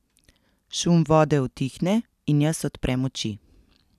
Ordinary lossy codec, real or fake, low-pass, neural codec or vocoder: none; real; 14.4 kHz; none